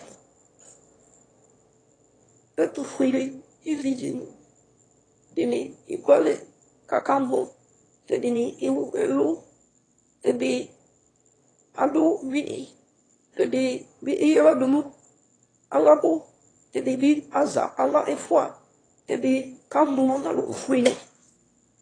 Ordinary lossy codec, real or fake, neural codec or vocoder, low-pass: AAC, 32 kbps; fake; autoencoder, 22.05 kHz, a latent of 192 numbers a frame, VITS, trained on one speaker; 9.9 kHz